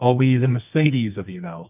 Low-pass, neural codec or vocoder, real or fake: 3.6 kHz; codec, 24 kHz, 0.9 kbps, WavTokenizer, medium music audio release; fake